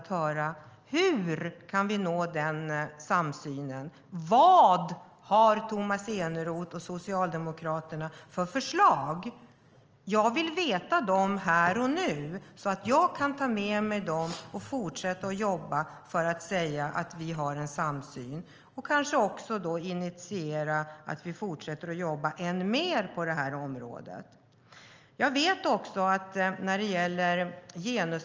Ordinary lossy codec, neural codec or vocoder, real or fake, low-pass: Opus, 24 kbps; none; real; 7.2 kHz